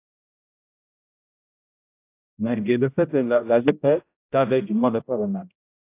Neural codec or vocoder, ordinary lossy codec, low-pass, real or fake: codec, 16 kHz, 0.5 kbps, X-Codec, HuBERT features, trained on general audio; AAC, 24 kbps; 3.6 kHz; fake